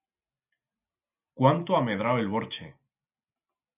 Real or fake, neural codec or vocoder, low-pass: real; none; 3.6 kHz